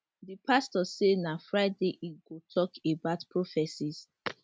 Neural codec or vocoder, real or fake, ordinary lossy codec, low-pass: none; real; none; none